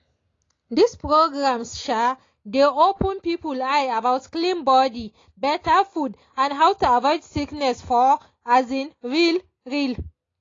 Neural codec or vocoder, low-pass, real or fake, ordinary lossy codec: none; 7.2 kHz; real; AAC, 32 kbps